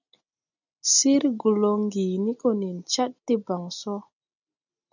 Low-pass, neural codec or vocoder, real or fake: 7.2 kHz; none; real